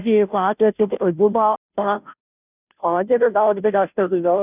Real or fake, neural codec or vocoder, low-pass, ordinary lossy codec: fake; codec, 16 kHz, 0.5 kbps, FunCodec, trained on Chinese and English, 25 frames a second; 3.6 kHz; none